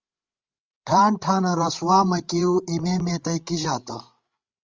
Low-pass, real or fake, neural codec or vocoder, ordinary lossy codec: 7.2 kHz; fake; codec, 16 kHz, 16 kbps, FreqCodec, larger model; Opus, 32 kbps